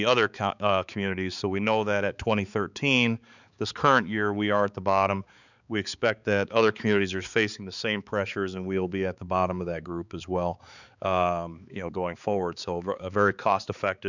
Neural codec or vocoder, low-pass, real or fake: codec, 16 kHz, 4 kbps, X-Codec, HuBERT features, trained on balanced general audio; 7.2 kHz; fake